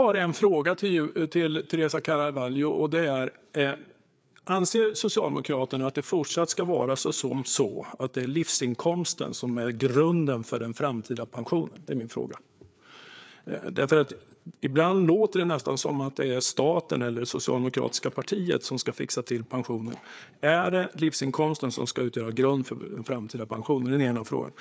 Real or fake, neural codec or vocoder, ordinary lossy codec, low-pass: fake; codec, 16 kHz, 4 kbps, FreqCodec, larger model; none; none